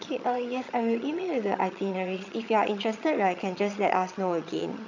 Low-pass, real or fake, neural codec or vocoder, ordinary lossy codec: 7.2 kHz; fake; vocoder, 22.05 kHz, 80 mel bands, HiFi-GAN; none